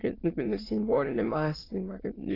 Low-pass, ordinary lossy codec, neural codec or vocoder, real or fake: 5.4 kHz; AAC, 24 kbps; autoencoder, 22.05 kHz, a latent of 192 numbers a frame, VITS, trained on many speakers; fake